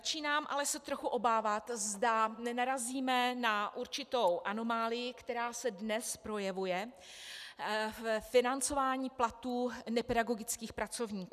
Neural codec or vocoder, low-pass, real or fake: none; 14.4 kHz; real